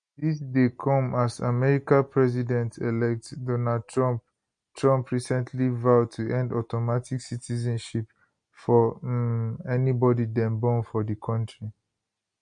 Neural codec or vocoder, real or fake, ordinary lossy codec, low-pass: none; real; MP3, 48 kbps; 9.9 kHz